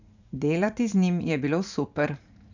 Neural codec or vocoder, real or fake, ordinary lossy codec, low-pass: vocoder, 44.1 kHz, 80 mel bands, Vocos; fake; none; 7.2 kHz